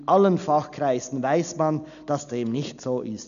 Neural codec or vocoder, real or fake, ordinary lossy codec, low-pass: none; real; none; 7.2 kHz